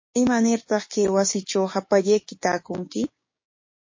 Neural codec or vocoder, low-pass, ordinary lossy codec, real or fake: codec, 16 kHz, 6 kbps, DAC; 7.2 kHz; MP3, 32 kbps; fake